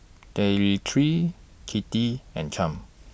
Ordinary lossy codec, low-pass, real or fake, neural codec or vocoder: none; none; real; none